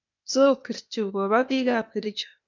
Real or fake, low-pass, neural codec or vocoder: fake; 7.2 kHz; codec, 16 kHz, 0.8 kbps, ZipCodec